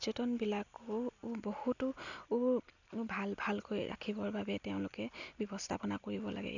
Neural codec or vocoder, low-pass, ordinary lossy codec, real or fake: none; 7.2 kHz; none; real